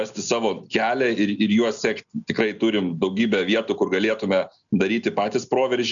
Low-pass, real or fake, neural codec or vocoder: 7.2 kHz; real; none